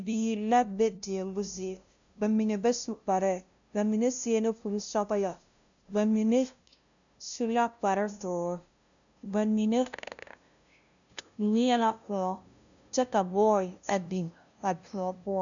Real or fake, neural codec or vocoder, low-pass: fake; codec, 16 kHz, 0.5 kbps, FunCodec, trained on LibriTTS, 25 frames a second; 7.2 kHz